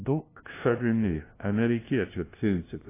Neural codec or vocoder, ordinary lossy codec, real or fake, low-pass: codec, 16 kHz, 0.5 kbps, FunCodec, trained on LibriTTS, 25 frames a second; AAC, 24 kbps; fake; 3.6 kHz